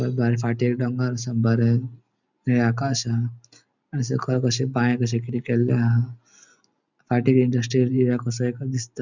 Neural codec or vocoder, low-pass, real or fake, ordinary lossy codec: none; 7.2 kHz; real; none